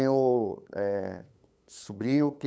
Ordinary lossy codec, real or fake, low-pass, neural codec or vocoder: none; fake; none; codec, 16 kHz, 8 kbps, FunCodec, trained on LibriTTS, 25 frames a second